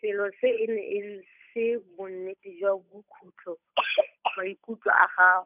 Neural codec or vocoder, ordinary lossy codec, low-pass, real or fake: codec, 16 kHz, 8 kbps, FunCodec, trained on Chinese and English, 25 frames a second; none; 3.6 kHz; fake